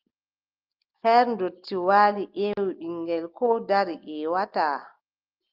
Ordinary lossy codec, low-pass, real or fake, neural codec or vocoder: Opus, 32 kbps; 5.4 kHz; real; none